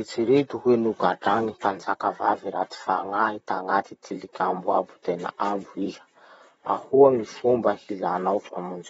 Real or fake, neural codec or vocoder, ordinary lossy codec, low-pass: fake; vocoder, 44.1 kHz, 128 mel bands, Pupu-Vocoder; AAC, 24 kbps; 19.8 kHz